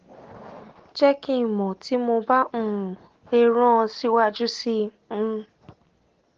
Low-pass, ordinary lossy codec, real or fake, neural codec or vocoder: 7.2 kHz; Opus, 16 kbps; real; none